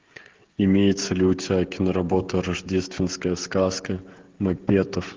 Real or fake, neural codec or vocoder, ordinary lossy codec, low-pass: fake; codec, 16 kHz, 16 kbps, FreqCodec, smaller model; Opus, 16 kbps; 7.2 kHz